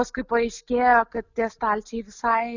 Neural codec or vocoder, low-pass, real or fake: none; 7.2 kHz; real